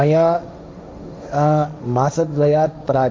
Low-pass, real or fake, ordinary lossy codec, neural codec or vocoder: none; fake; none; codec, 16 kHz, 1.1 kbps, Voila-Tokenizer